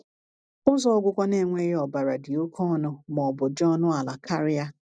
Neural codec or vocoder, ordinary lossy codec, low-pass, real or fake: none; none; 9.9 kHz; real